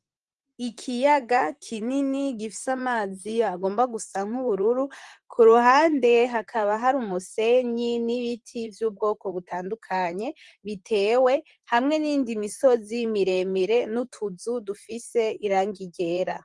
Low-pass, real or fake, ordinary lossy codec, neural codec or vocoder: 10.8 kHz; fake; Opus, 24 kbps; vocoder, 44.1 kHz, 128 mel bands, Pupu-Vocoder